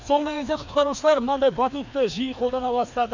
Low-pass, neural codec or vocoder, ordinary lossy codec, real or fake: 7.2 kHz; codec, 16 kHz, 2 kbps, FreqCodec, larger model; none; fake